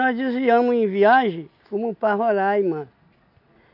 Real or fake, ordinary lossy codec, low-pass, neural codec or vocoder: real; none; 5.4 kHz; none